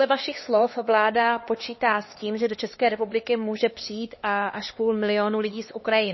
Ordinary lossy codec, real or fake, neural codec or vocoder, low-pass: MP3, 24 kbps; fake; codec, 16 kHz, 2 kbps, X-Codec, HuBERT features, trained on LibriSpeech; 7.2 kHz